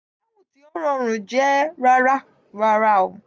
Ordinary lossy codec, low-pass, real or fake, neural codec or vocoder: none; none; real; none